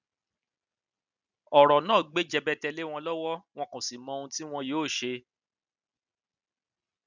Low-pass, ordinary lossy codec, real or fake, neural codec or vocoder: 7.2 kHz; none; real; none